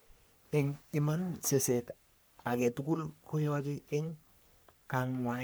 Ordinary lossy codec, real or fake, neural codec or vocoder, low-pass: none; fake; codec, 44.1 kHz, 3.4 kbps, Pupu-Codec; none